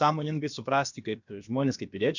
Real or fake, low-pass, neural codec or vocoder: fake; 7.2 kHz; codec, 16 kHz, about 1 kbps, DyCAST, with the encoder's durations